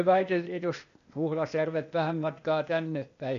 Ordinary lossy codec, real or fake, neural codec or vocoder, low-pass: MP3, 48 kbps; fake; codec, 16 kHz, 0.8 kbps, ZipCodec; 7.2 kHz